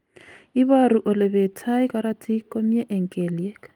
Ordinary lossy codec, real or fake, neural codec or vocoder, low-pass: Opus, 32 kbps; real; none; 19.8 kHz